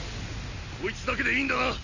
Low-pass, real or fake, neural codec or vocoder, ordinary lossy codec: 7.2 kHz; real; none; none